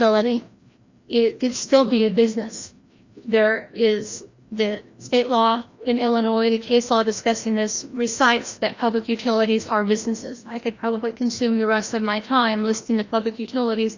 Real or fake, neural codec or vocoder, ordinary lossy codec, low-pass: fake; codec, 16 kHz, 1 kbps, FreqCodec, larger model; Opus, 64 kbps; 7.2 kHz